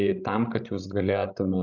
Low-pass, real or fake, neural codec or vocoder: 7.2 kHz; fake; codec, 16 kHz, 16 kbps, FreqCodec, larger model